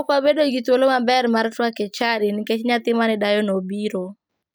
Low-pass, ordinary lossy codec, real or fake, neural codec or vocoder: none; none; real; none